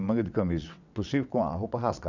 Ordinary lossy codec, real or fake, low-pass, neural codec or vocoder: none; fake; 7.2 kHz; vocoder, 22.05 kHz, 80 mel bands, WaveNeXt